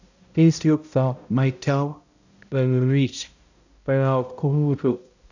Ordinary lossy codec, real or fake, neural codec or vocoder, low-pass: none; fake; codec, 16 kHz, 0.5 kbps, X-Codec, HuBERT features, trained on balanced general audio; 7.2 kHz